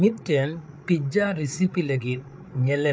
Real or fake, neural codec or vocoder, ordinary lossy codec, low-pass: fake; codec, 16 kHz, 8 kbps, FreqCodec, larger model; none; none